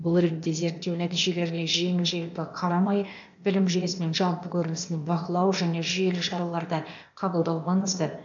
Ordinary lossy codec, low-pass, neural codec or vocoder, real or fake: AAC, 64 kbps; 7.2 kHz; codec, 16 kHz, 0.8 kbps, ZipCodec; fake